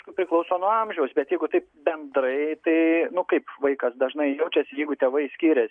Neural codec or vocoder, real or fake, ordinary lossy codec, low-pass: none; real; MP3, 96 kbps; 9.9 kHz